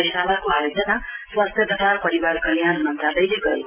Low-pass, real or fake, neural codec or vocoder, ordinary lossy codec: 3.6 kHz; real; none; Opus, 32 kbps